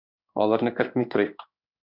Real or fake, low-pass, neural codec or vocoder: fake; 5.4 kHz; codec, 16 kHz in and 24 kHz out, 1 kbps, XY-Tokenizer